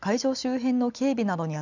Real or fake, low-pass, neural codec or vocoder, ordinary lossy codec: real; 7.2 kHz; none; none